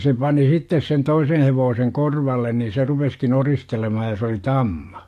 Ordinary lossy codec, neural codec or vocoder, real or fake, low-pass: AAC, 64 kbps; codec, 44.1 kHz, 7.8 kbps, DAC; fake; 14.4 kHz